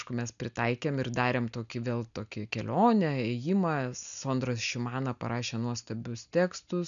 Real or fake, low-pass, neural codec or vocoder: real; 7.2 kHz; none